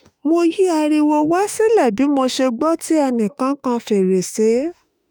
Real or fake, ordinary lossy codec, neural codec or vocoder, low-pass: fake; none; autoencoder, 48 kHz, 32 numbers a frame, DAC-VAE, trained on Japanese speech; none